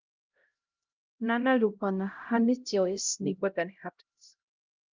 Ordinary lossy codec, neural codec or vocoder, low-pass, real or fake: Opus, 24 kbps; codec, 16 kHz, 0.5 kbps, X-Codec, HuBERT features, trained on LibriSpeech; 7.2 kHz; fake